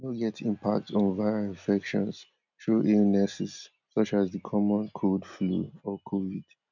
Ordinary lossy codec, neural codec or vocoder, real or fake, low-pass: none; none; real; 7.2 kHz